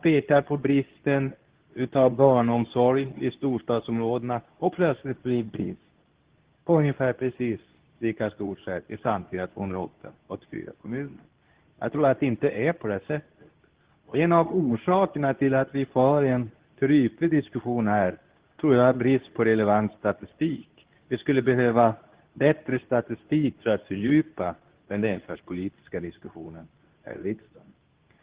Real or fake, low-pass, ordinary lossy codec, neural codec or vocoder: fake; 3.6 kHz; Opus, 16 kbps; codec, 24 kHz, 0.9 kbps, WavTokenizer, medium speech release version 2